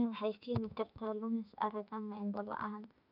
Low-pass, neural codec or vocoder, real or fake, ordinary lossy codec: 5.4 kHz; autoencoder, 48 kHz, 32 numbers a frame, DAC-VAE, trained on Japanese speech; fake; none